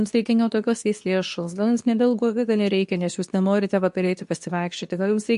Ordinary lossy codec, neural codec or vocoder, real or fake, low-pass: MP3, 64 kbps; codec, 24 kHz, 0.9 kbps, WavTokenizer, medium speech release version 2; fake; 10.8 kHz